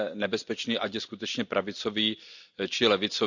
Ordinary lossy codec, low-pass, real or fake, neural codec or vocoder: none; 7.2 kHz; real; none